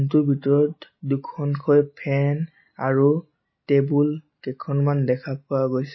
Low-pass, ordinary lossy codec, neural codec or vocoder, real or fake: 7.2 kHz; MP3, 24 kbps; none; real